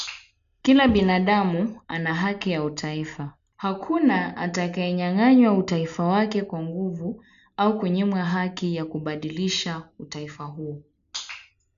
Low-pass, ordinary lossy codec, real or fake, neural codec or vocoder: 7.2 kHz; none; real; none